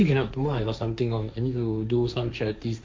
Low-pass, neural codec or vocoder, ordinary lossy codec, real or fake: none; codec, 16 kHz, 1.1 kbps, Voila-Tokenizer; none; fake